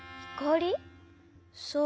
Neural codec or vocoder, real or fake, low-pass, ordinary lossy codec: none; real; none; none